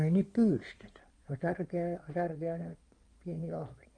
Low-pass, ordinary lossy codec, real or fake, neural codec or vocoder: 9.9 kHz; Opus, 32 kbps; fake; codec, 16 kHz in and 24 kHz out, 2.2 kbps, FireRedTTS-2 codec